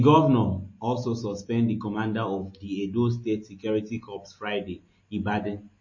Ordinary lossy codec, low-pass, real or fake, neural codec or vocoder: MP3, 32 kbps; 7.2 kHz; real; none